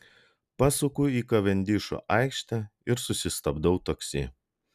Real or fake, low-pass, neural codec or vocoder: real; 14.4 kHz; none